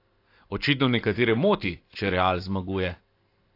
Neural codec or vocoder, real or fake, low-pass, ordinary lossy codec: none; real; 5.4 kHz; AAC, 32 kbps